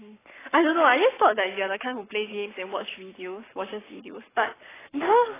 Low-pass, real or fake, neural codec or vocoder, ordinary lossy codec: 3.6 kHz; fake; codec, 44.1 kHz, 7.8 kbps, Pupu-Codec; AAC, 16 kbps